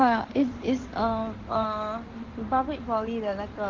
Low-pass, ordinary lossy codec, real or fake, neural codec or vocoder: 7.2 kHz; Opus, 16 kbps; fake; codec, 16 kHz in and 24 kHz out, 2.2 kbps, FireRedTTS-2 codec